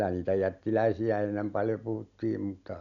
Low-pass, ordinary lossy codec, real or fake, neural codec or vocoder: 7.2 kHz; none; real; none